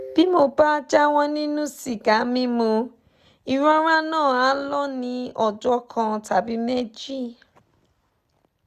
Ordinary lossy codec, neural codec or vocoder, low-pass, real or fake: none; none; 14.4 kHz; real